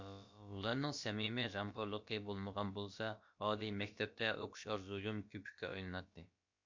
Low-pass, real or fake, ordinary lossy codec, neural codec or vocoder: 7.2 kHz; fake; MP3, 48 kbps; codec, 16 kHz, about 1 kbps, DyCAST, with the encoder's durations